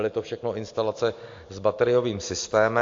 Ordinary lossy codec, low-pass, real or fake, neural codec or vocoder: AAC, 48 kbps; 7.2 kHz; real; none